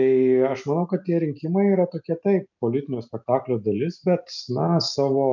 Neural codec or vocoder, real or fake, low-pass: none; real; 7.2 kHz